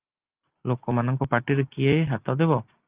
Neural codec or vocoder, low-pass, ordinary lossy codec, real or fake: none; 3.6 kHz; Opus, 32 kbps; real